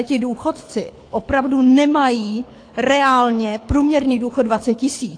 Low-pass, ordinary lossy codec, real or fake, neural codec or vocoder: 9.9 kHz; AAC, 48 kbps; fake; codec, 24 kHz, 6 kbps, HILCodec